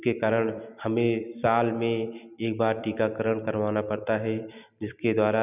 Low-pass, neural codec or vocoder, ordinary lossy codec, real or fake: 3.6 kHz; vocoder, 44.1 kHz, 128 mel bands every 256 samples, BigVGAN v2; none; fake